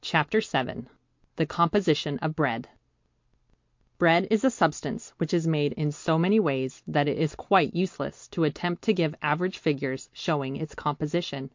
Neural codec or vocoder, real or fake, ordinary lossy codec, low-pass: none; real; MP3, 48 kbps; 7.2 kHz